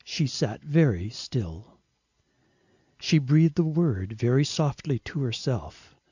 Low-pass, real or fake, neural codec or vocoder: 7.2 kHz; real; none